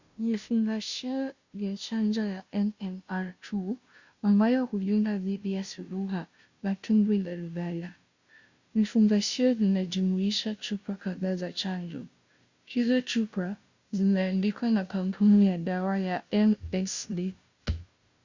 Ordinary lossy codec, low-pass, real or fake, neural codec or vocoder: Opus, 64 kbps; 7.2 kHz; fake; codec, 16 kHz, 0.5 kbps, FunCodec, trained on Chinese and English, 25 frames a second